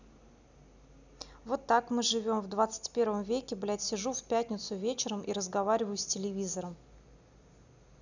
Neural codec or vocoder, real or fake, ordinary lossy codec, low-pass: none; real; none; 7.2 kHz